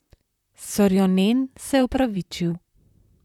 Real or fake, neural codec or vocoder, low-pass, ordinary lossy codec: fake; vocoder, 44.1 kHz, 128 mel bands, Pupu-Vocoder; 19.8 kHz; none